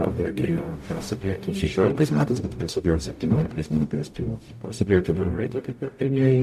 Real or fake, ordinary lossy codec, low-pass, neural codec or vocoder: fake; AAC, 96 kbps; 14.4 kHz; codec, 44.1 kHz, 0.9 kbps, DAC